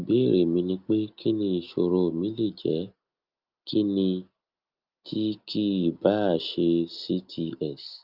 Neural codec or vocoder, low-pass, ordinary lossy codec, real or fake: none; 5.4 kHz; Opus, 24 kbps; real